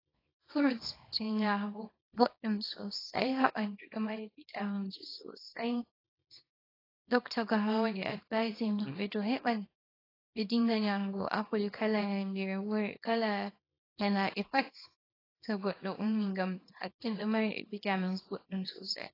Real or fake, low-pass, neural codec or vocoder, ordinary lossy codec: fake; 5.4 kHz; codec, 24 kHz, 0.9 kbps, WavTokenizer, small release; AAC, 24 kbps